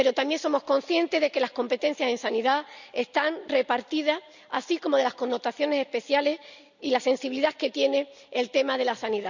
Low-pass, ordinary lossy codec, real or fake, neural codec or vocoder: 7.2 kHz; none; real; none